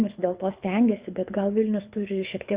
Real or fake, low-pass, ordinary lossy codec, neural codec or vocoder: fake; 3.6 kHz; Opus, 64 kbps; codec, 24 kHz, 6 kbps, HILCodec